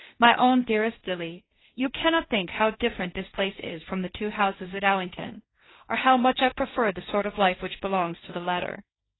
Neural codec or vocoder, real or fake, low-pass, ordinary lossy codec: codec, 16 kHz, 1.1 kbps, Voila-Tokenizer; fake; 7.2 kHz; AAC, 16 kbps